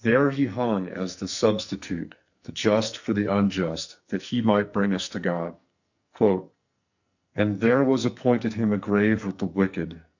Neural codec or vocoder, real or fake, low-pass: codec, 44.1 kHz, 2.6 kbps, SNAC; fake; 7.2 kHz